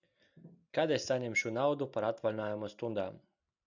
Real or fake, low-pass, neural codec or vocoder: real; 7.2 kHz; none